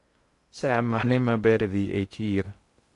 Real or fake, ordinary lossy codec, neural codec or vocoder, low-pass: fake; AAC, 48 kbps; codec, 16 kHz in and 24 kHz out, 0.6 kbps, FocalCodec, streaming, 2048 codes; 10.8 kHz